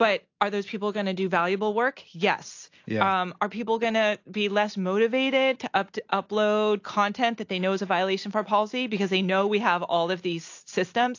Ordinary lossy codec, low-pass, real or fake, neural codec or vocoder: AAC, 48 kbps; 7.2 kHz; real; none